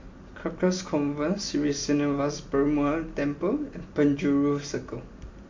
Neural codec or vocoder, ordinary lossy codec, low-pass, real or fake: none; MP3, 48 kbps; 7.2 kHz; real